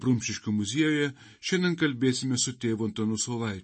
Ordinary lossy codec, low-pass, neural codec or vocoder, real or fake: MP3, 32 kbps; 10.8 kHz; none; real